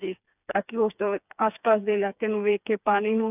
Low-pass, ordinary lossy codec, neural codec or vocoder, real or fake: 3.6 kHz; none; codec, 16 kHz, 4 kbps, FreqCodec, smaller model; fake